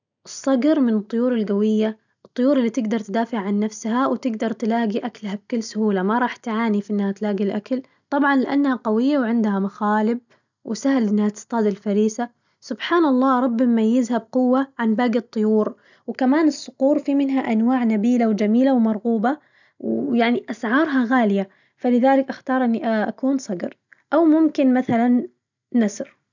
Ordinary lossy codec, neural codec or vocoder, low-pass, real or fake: none; none; 7.2 kHz; real